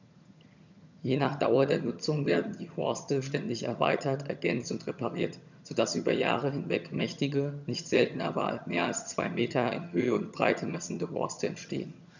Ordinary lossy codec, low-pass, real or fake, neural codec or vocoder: none; 7.2 kHz; fake; vocoder, 22.05 kHz, 80 mel bands, HiFi-GAN